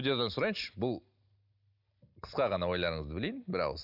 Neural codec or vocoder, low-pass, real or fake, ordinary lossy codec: none; 5.4 kHz; real; none